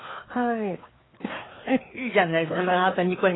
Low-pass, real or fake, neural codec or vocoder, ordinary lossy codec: 7.2 kHz; fake; codec, 16 kHz, 2 kbps, FreqCodec, larger model; AAC, 16 kbps